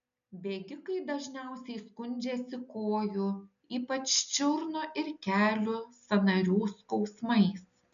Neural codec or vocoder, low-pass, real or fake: none; 7.2 kHz; real